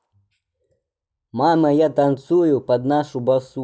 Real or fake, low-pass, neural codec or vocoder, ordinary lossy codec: real; none; none; none